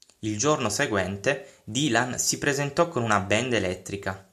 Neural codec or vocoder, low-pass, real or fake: none; 14.4 kHz; real